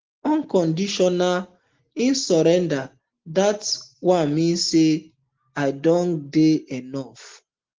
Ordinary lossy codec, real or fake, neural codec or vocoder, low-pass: Opus, 16 kbps; real; none; 7.2 kHz